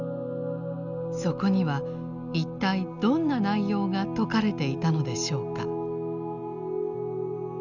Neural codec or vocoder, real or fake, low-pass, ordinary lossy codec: none; real; 7.2 kHz; none